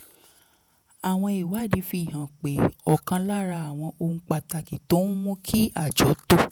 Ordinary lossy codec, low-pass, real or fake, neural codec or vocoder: none; none; fake; vocoder, 48 kHz, 128 mel bands, Vocos